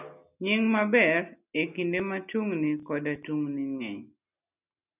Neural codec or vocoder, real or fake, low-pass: none; real; 3.6 kHz